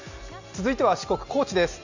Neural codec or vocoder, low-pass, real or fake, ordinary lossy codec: none; 7.2 kHz; real; Opus, 64 kbps